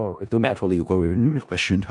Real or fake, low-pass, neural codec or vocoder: fake; 10.8 kHz; codec, 16 kHz in and 24 kHz out, 0.4 kbps, LongCat-Audio-Codec, four codebook decoder